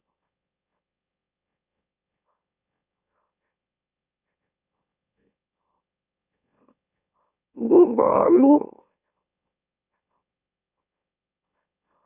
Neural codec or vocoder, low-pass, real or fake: autoencoder, 44.1 kHz, a latent of 192 numbers a frame, MeloTTS; 3.6 kHz; fake